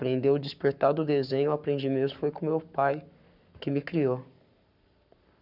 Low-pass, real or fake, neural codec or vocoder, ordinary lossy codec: 5.4 kHz; fake; codec, 44.1 kHz, 7.8 kbps, Pupu-Codec; none